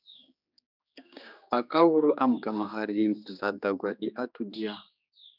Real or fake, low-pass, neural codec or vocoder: fake; 5.4 kHz; codec, 16 kHz, 2 kbps, X-Codec, HuBERT features, trained on general audio